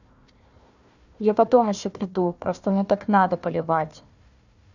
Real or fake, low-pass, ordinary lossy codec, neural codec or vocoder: fake; 7.2 kHz; none; codec, 16 kHz, 1 kbps, FunCodec, trained on Chinese and English, 50 frames a second